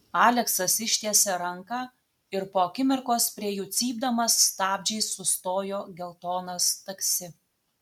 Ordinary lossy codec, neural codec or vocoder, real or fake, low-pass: MP3, 96 kbps; none; real; 19.8 kHz